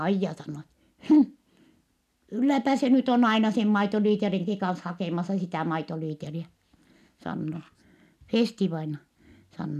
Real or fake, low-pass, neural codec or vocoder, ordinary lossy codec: real; 14.4 kHz; none; none